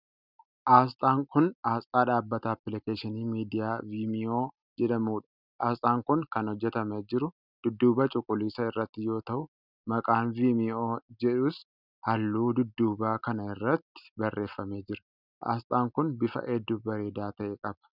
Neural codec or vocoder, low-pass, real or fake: none; 5.4 kHz; real